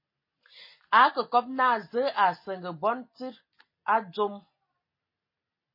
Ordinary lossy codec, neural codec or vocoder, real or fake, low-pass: MP3, 24 kbps; none; real; 5.4 kHz